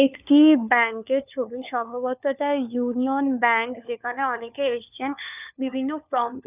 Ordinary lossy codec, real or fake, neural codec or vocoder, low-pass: none; fake; codec, 16 kHz, 4 kbps, FunCodec, trained on LibriTTS, 50 frames a second; 3.6 kHz